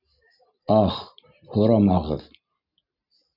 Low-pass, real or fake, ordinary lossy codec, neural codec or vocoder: 5.4 kHz; real; AAC, 48 kbps; none